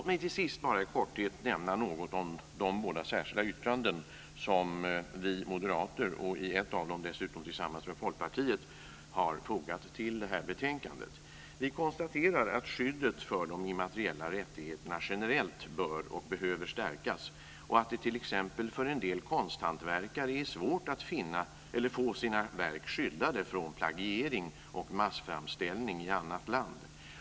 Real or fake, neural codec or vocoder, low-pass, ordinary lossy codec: real; none; none; none